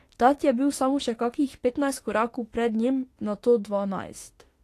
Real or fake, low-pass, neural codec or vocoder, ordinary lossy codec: fake; 14.4 kHz; autoencoder, 48 kHz, 32 numbers a frame, DAC-VAE, trained on Japanese speech; AAC, 48 kbps